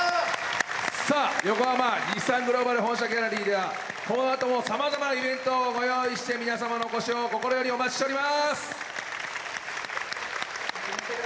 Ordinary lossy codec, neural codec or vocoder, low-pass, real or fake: none; none; none; real